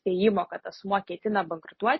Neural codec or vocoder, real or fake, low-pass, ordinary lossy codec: none; real; 7.2 kHz; MP3, 24 kbps